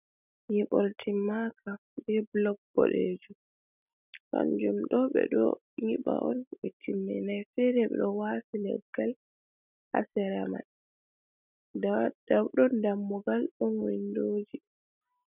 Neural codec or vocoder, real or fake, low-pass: none; real; 3.6 kHz